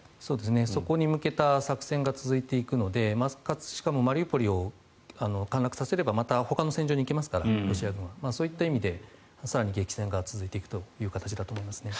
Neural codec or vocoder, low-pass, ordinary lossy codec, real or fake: none; none; none; real